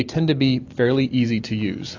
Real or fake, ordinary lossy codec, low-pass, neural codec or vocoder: real; AAC, 48 kbps; 7.2 kHz; none